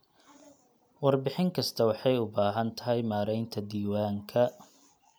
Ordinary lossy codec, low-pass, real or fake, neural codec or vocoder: none; none; real; none